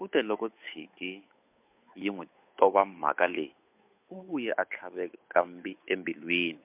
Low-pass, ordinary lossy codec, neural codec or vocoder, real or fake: 3.6 kHz; MP3, 32 kbps; codec, 16 kHz, 8 kbps, FunCodec, trained on Chinese and English, 25 frames a second; fake